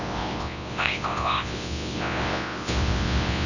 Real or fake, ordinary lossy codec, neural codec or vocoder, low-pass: fake; none; codec, 24 kHz, 0.9 kbps, WavTokenizer, large speech release; 7.2 kHz